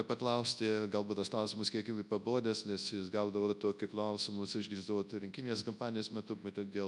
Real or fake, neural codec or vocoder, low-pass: fake; codec, 24 kHz, 0.9 kbps, WavTokenizer, large speech release; 10.8 kHz